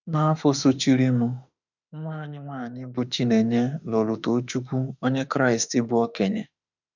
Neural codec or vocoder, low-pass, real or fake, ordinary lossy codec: autoencoder, 48 kHz, 32 numbers a frame, DAC-VAE, trained on Japanese speech; 7.2 kHz; fake; none